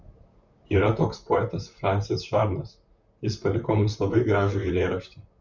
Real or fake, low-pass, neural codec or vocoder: fake; 7.2 kHz; vocoder, 44.1 kHz, 128 mel bands, Pupu-Vocoder